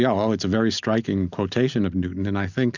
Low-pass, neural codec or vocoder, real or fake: 7.2 kHz; none; real